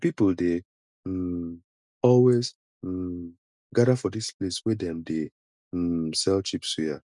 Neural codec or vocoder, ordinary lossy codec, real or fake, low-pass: none; none; real; 10.8 kHz